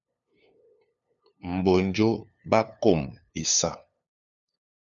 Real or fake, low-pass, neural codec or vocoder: fake; 7.2 kHz; codec, 16 kHz, 2 kbps, FunCodec, trained on LibriTTS, 25 frames a second